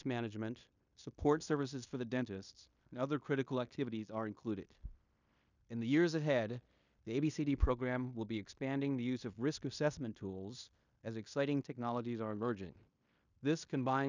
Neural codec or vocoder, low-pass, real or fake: codec, 16 kHz in and 24 kHz out, 0.9 kbps, LongCat-Audio-Codec, fine tuned four codebook decoder; 7.2 kHz; fake